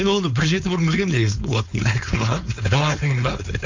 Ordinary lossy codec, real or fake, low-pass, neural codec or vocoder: MP3, 64 kbps; fake; 7.2 kHz; codec, 16 kHz, 4.8 kbps, FACodec